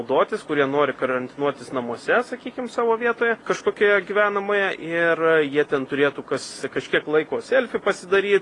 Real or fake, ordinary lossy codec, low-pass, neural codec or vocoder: real; AAC, 32 kbps; 10.8 kHz; none